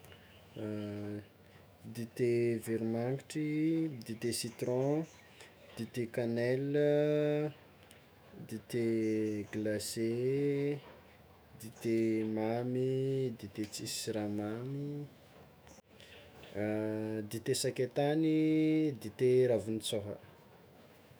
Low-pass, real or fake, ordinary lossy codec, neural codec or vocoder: none; fake; none; autoencoder, 48 kHz, 128 numbers a frame, DAC-VAE, trained on Japanese speech